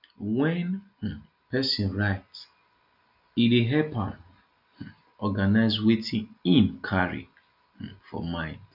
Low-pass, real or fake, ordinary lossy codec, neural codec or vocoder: 5.4 kHz; real; none; none